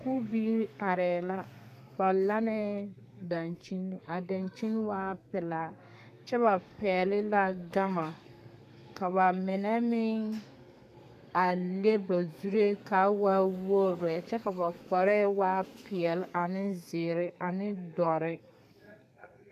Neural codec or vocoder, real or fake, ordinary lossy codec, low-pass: codec, 32 kHz, 1.9 kbps, SNAC; fake; MP3, 96 kbps; 14.4 kHz